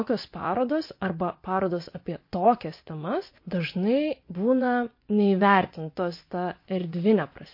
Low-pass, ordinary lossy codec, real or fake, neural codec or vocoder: 5.4 kHz; MP3, 32 kbps; fake; vocoder, 24 kHz, 100 mel bands, Vocos